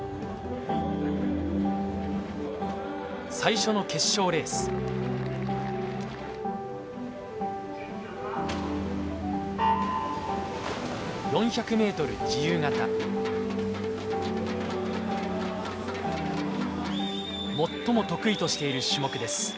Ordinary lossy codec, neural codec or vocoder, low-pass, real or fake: none; none; none; real